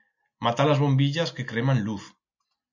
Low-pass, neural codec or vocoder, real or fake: 7.2 kHz; none; real